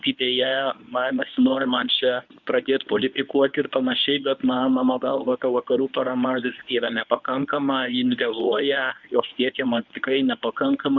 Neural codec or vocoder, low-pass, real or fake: codec, 24 kHz, 0.9 kbps, WavTokenizer, medium speech release version 1; 7.2 kHz; fake